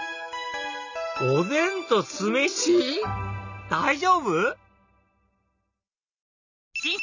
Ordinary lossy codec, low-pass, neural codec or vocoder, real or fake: none; 7.2 kHz; none; real